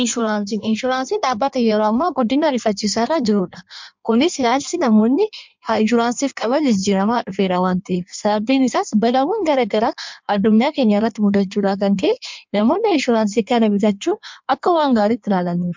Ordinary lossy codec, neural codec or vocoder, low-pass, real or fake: MP3, 64 kbps; codec, 16 kHz in and 24 kHz out, 1.1 kbps, FireRedTTS-2 codec; 7.2 kHz; fake